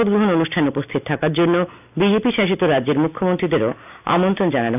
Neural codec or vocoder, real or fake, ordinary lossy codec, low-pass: none; real; none; 3.6 kHz